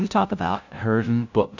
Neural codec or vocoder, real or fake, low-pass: codec, 16 kHz, 0.5 kbps, FunCodec, trained on LibriTTS, 25 frames a second; fake; 7.2 kHz